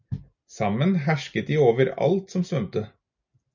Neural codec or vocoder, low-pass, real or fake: none; 7.2 kHz; real